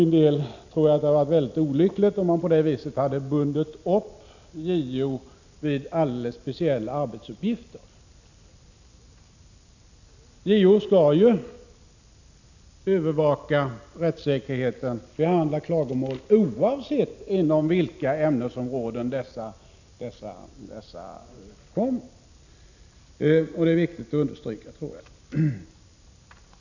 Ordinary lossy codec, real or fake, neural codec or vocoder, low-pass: none; real; none; 7.2 kHz